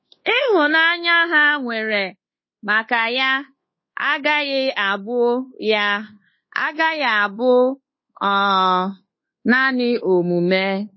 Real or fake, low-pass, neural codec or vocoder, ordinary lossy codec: fake; 7.2 kHz; codec, 24 kHz, 1.2 kbps, DualCodec; MP3, 24 kbps